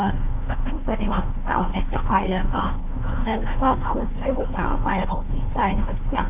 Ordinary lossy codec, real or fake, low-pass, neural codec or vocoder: none; fake; 3.6 kHz; codec, 16 kHz, 1 kbps, FunCodec, trained on Chinese and English, 50 frames a second